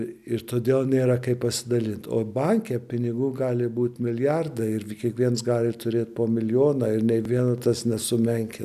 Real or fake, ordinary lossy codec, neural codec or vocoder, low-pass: real; AAC, 96 kbps; none; 14.4 kHz